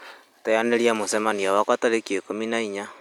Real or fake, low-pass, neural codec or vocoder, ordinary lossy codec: real; 19.8 kHz; none; none